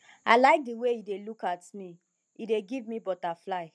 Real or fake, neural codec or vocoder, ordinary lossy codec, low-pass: real; none; none; none